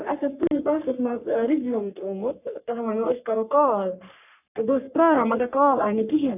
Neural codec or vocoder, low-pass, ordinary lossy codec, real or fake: codec, 44.1 kHz, 3.4 kbps, Pupu-Codec; 3.6 kHz; none; fake